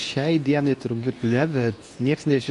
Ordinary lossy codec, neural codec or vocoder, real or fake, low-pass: AAC, 48 kbps; codec, 24 kHz, 0.9 kbps, WavTokenizer, medium speech release version 2; fake; 10.8 kHz